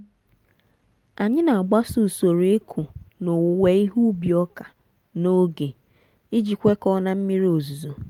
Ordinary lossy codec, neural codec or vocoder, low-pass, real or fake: Opus, 32 kbps; none; 19.8 kHz; real